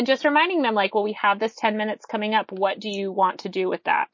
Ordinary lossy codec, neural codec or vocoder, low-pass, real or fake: MP3, 32 kbps; none; 7.2 kHz; real